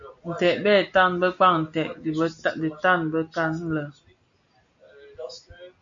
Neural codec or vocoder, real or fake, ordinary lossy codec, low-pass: none; real; AAC, 64 kbps; 7.2 kHz